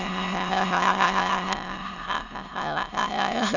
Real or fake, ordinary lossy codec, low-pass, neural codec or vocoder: fake; none; 7.2 kHz; autoencoder, 22.05 kHz, a latent of 192 numbers a frame, VITS, trained on many speakers